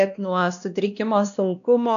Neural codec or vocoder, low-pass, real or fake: codec, 16 kHz, 1 kbps, X-Codec, WavLM features, trained on Multilingual LibriSpeech; 7.2 kHz; fake